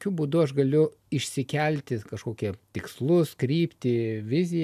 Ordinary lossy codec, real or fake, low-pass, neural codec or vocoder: AAC, 96 kbps; real; 14.4 kHz; none